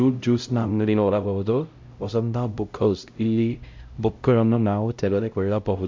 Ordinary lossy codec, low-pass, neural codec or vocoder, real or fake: MP3, 48 kbps; 7.2 kHz; codec, 16 kHz, 0.5 kbps, X-Codec, HuBERT features, trained on LibriSpeech; fake